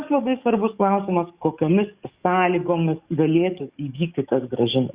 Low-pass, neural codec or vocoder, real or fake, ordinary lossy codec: 3.6 kHz; codec, 44.1 kHz, 7.8 kbps, DAC; fake; Opus, 64 kbps